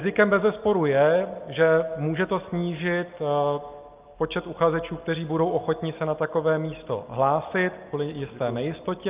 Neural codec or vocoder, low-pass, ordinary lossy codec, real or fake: none; 3.6 kHz; Opus, 24 kbps; real